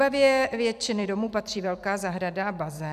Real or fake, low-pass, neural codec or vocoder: fake; 14.4 kHz; vocoder, 44.1 kHz, 128 mel bands every 256 samples, BigVGAN v2